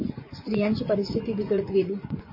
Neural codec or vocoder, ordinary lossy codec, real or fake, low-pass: vocoder, 24 kHz, 100 mel bands, Vocos; MP3, 24 kbps; fake; 5.4 kHz